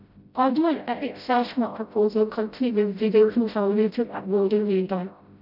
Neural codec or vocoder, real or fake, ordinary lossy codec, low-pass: codec, 16 kHz, 0.5 kbps, FreqCodec, smaller model; fake; none; 5.4 kHz